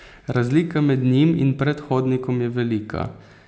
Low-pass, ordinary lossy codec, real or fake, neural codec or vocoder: none; none; real; none